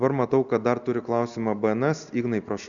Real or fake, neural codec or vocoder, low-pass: real; none; 7.2 kHz